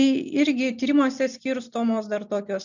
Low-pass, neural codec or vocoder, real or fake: 7.2 kHz; none; real